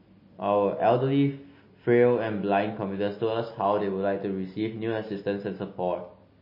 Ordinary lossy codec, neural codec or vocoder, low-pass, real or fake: MP3, 24 kbps; none; 5.4 kHz; real